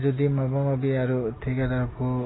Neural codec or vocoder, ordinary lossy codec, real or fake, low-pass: none; AAC, 16 kbps; real; 7.2 kHz